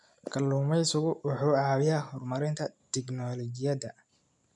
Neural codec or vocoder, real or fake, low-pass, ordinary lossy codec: none; real; 10.8 kHz; none